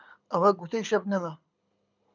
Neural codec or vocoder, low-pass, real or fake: codec, 24 kHz, 6 kbps, HILCodec; 7.2 kHz; fake